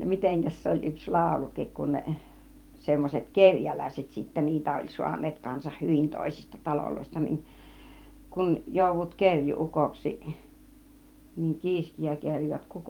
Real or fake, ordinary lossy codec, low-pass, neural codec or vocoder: fake; Opus, 32 kbps; 19.8 kHz; vocoder, 44.1 kHz, 128 mel bands every 512 samples, BigVGAN v2